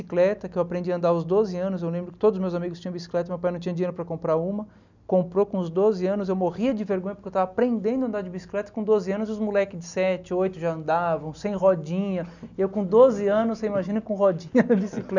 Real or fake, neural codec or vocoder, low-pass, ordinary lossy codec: real; none; 7.2 kHz; none